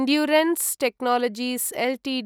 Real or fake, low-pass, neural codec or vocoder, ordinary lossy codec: real; none; none; none